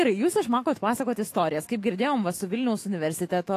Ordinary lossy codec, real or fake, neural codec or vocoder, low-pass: AAC, 48 kbps; fake; autoencoder, 48 kHz, 128 numbers a frame, DAC-VAE, trained on Japanese speech; 14.4 kHz